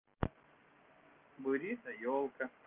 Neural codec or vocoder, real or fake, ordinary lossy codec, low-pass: none; real; Opus, 32 kbps; 3.6 kHz